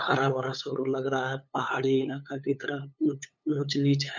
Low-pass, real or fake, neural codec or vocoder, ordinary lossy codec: none; fake; codec, 16 kHz, 8 kbps, FunCodec, trained on LibriTTS, 25 frames a second; none